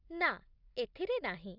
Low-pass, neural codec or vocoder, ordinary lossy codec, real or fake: 5.4 kHz; none; none; real